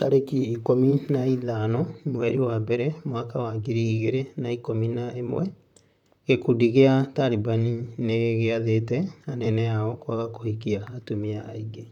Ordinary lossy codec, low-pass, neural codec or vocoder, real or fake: none; 19.8 kHz; vocoder, 44.1 kHz, 128 mel bands, Pupu-Vocoder; fake